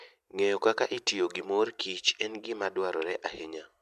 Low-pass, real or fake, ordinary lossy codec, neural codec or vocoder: 14.4 kHz; real; MP3, 96 kbps; none